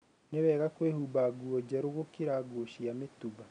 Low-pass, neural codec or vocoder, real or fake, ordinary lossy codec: 9.9 kHz; none; real; none